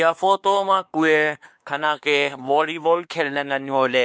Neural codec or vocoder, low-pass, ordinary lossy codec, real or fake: codec, 16 kHz, 2 kbps, X-Codec, WavLM features, trained on Multilingual LibriSpeech; none; none; fake